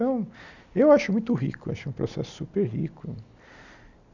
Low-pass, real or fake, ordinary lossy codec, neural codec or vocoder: 7.2 kHz; real; none; none